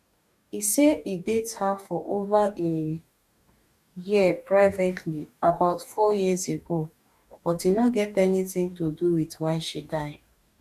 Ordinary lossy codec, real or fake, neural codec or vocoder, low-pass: none; fake; codec, 44.1 kHz, 2.6 kbps, DAC; 14.4 kHz